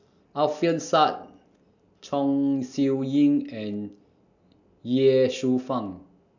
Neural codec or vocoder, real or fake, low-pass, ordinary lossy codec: none; real; 7.2 kHz; none